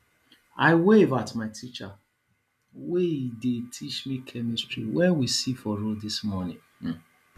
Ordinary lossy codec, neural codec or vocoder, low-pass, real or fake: none; none; 14.4 kHz; real